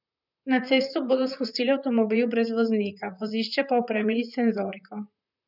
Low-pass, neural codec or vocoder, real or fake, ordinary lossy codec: 5.4 kHz; vocoder, 44.1 kHz, 128 mel bands, Pupu-Vocoder; fake; none